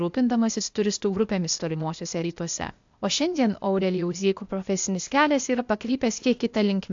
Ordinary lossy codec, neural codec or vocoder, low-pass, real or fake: AAC, 64 kbps; codec, 16 kHz, 0.8 kbps, ZipCodec; 7.2 kHz; fake